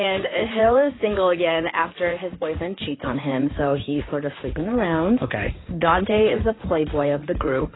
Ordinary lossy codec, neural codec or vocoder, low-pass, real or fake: AAC, 16 kbps; codec, 16 kHz in and 24 kHz out, 2.2 kbps, FireRedTTS-2 codec; 7.2 kHz; fake